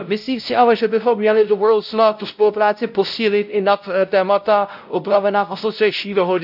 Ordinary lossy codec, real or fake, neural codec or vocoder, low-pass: none; fake; codec, 16 kHz, 0.5 kbps, X-Codec, WavLM features, trained on Multilingual LibriSpeech; 5.4 kHz